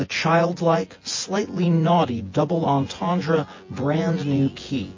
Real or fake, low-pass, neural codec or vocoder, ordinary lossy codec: fake; 7.2 kHz; vocoder, 24 kHz, 100 mel bands, Vocos; MP3, 32 kbps